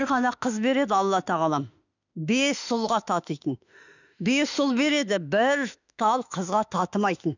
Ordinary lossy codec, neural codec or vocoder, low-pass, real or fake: none; autoencoder, 48 kHz, 32 numbers a frame, DAC-VAE, trained on Japanese speech; 7.2 kHz; fake